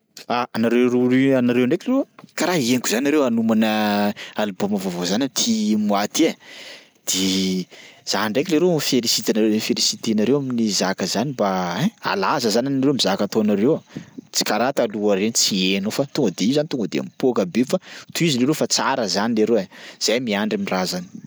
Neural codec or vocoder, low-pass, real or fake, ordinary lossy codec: none; none; real; none